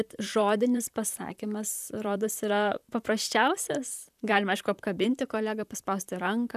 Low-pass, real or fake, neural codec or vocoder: 14.4 kHz; fake; vocoder, 44.1 kHz, 128 mel bands, Pupu-Vocoder